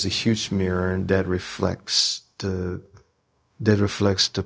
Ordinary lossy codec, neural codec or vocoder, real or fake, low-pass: none; codec, 16 kHz, 0.4 kbps, LongCat-Audio-Codec; fake; none